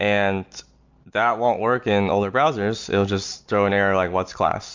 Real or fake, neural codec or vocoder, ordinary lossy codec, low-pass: real; none; MP3, 64 kbps; 7.2 kHz